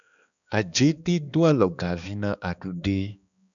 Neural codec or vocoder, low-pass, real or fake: codec, 16 kHz, 2 kbps, X-Codec, HuBERT features, trained on balanced general audio; 7.2 kHz; fake